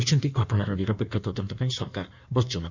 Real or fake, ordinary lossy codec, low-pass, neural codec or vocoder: fake; none; 7.2 kHz; codec, 16 kHz in and 24 kHz out, 1.1 kbps, FireRedTTS-2 codec